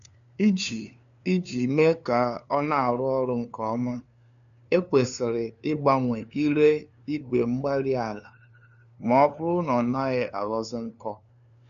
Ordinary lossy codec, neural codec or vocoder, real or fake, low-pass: none; codec, 16 kHz, 2 kbps, FunCodec, trained on LibriTTS, 25 frames a second; fake; 7.2 kHz